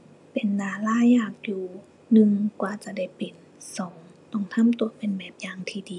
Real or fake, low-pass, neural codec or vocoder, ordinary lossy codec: real; 10.8 kHz; none; none